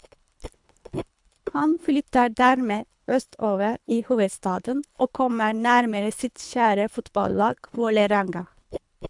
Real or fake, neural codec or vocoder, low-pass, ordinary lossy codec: fake; codec, 24 kHz, 3 kbps, HILCodec; 10.8 kHz; MP3, 96 kbps